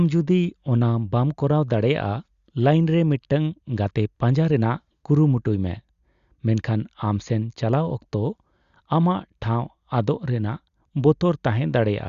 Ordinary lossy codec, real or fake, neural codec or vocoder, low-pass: Opus, 64 kbps; real; none; 7.2 kHz